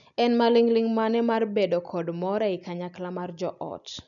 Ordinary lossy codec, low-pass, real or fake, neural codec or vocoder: none; 7.2 kHz; real; none